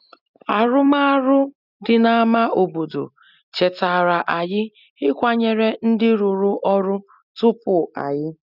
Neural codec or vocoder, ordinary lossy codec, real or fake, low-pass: none; none; real; 5.4 kHz